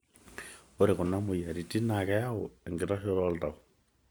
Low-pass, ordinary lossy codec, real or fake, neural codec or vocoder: none; none; real; none